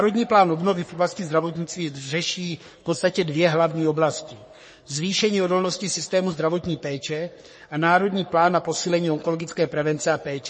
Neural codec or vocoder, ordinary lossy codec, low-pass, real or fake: codec, 44.1 kHz, 3.4 kbps, Pupu-Codec; MP3, 32 kbps; 10.8 kHz; fake